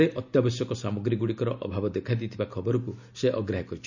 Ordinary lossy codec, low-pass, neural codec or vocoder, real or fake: none; 7.2 kHz; none; real